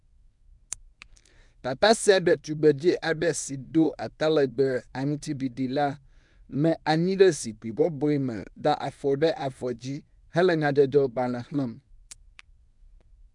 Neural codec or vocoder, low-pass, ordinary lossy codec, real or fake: codec, 24 kHz, 0.9 kbps, WavTokenizer, medium speech release version 1; 10.8 kHz; MP3, 96 kbps; fake